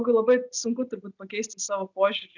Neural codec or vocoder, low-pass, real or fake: none; 7.2 kHz; real